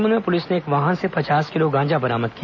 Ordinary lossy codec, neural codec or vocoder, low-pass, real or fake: none; none; 7.2 kHz; real